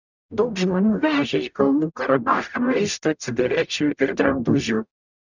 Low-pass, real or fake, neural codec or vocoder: 7.2 kHz; fake; codec, 44.1 kHz, 0.9 kbps, DAC